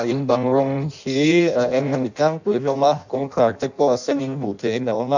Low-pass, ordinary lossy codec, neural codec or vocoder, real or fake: 7.2 kHz; none; codec, 16 kHz in and 24 kHz out, 0.6 kbps, FireRedTTS-2 codec; fake